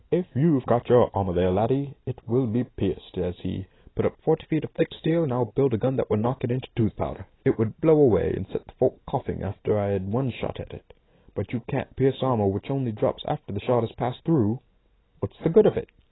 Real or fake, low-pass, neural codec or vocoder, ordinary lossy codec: real; 7.2 kHz; none; AAC, 16 kbps